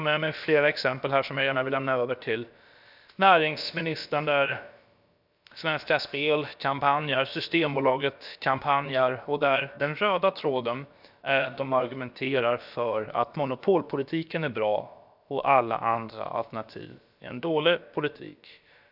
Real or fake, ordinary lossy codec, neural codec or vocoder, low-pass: fake; none; codec, 16 kHz, about 1 kbps, DyCAST, with the encoder's durations; 5.4 kHz